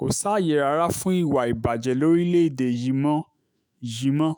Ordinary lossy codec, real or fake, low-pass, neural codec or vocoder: none; fake; none; autoencoder, 48 kHz, 128 numbers a frame, DAC-VAE, trained on Japanese speech